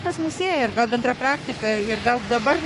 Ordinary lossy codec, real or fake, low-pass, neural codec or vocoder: MP3, 48 kbps; fake; 14.4 kHz; codec, 44.1 kHz, 3.4 kbps, Pupu-Codec